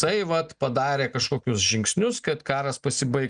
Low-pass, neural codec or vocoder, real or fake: 9.9 kHz; none; real